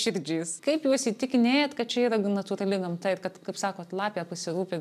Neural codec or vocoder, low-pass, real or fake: none; 14.4 kHz; real